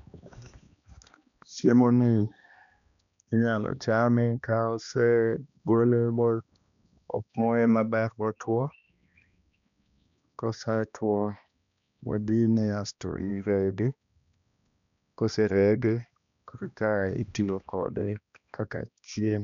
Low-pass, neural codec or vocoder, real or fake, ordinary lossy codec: 7.2 kHz; codec, 16 kHz, 1 kbps, X-Codec, HuBERT features, trained on balanced general audio; fake; none